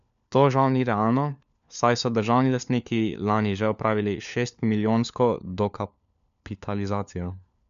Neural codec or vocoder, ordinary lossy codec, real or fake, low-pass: codec, 16 kHz, 4 kbps, FunCodec, trained on LibriTTS, 50 frames a second; none; fake; 7.2 kHz